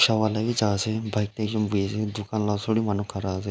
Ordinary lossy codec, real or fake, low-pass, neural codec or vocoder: none; real; none; none